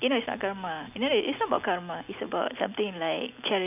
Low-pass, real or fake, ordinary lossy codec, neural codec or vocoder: 3.6 kHz; real; none; none